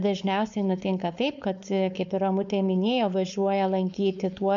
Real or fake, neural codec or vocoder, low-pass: fake; codec, 16 kHz, 4.8 kbps, FACodec; 7.2 kHz